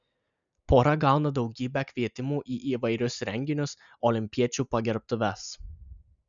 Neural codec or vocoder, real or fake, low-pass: none; real; 7.2 kHz